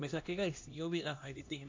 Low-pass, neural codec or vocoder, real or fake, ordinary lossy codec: 7.2 kHz; codec, 16 kHz, 1 kbps, X-Codec, HuBERT features, trained on LibriSpeech; fake; MP3, 64 kbps